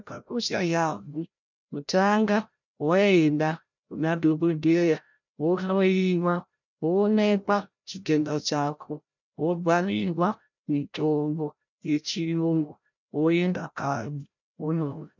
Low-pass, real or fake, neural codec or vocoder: 7.2 kHz; fake; codec, 16 kHz, 0.5 kbps, FreqCodec, larger model